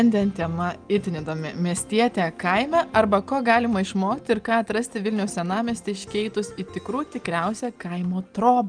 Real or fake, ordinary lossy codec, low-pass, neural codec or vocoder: real; Opus, 32 kbps; 9.9 kHz; none